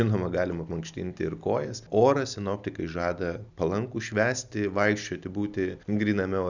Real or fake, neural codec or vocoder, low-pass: real; none; 7.2 kHz